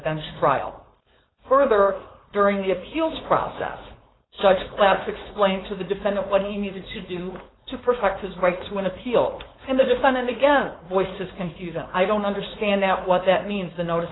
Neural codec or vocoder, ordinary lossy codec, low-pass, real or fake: codec, 16 kHz, 4.8 kbps, FACodec; AAC, 16 kbps; 7.2 kHz; fake